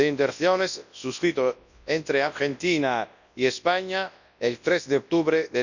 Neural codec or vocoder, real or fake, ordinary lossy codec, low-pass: codec, 24 kHz, 0.9 kbps, WavTokenizer, large speech release; fake; none; 7.2 kHz